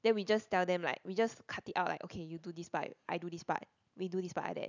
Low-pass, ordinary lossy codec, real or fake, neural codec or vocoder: 7.2 kHz; none; real; none